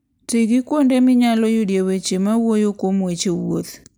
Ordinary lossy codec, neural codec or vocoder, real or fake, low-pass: none; none; real; none